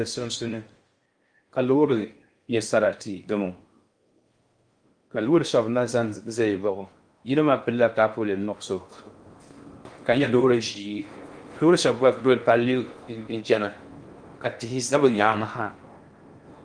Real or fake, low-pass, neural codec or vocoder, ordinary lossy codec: fake; 9.9 kHz; codec, 16 kHz in and 24 kHz out, 0.6 kbps, FocalCodec, streaming, 4096 codes; Opus, 32 kbps